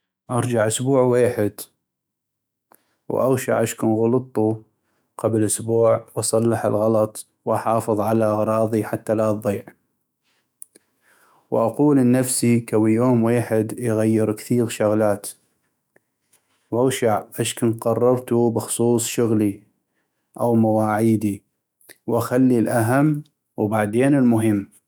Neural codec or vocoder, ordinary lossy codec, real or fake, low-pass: autoencoder, 48 kHz, 128 numbers a frame, DAC-VAE, trained on Japanese speech; none; fake; none